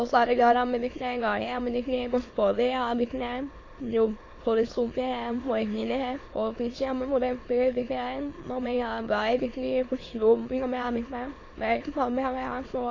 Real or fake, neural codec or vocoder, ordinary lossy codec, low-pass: fake; autoencoder, 22.05 kHz, a latent of 192 numbers a frame, VITS, trained on many speakers; AAC, 32 kbps; 7.2 kHz